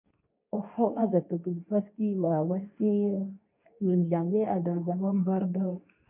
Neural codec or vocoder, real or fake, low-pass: codec, 24 kHz, 0.9 kbps, WavTokenizer, medium speech release version 2; fake; 3.6 kHz